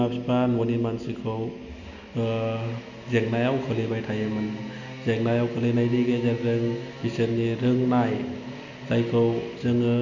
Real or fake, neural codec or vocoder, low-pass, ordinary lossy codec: real; none; 7.2 kHz; none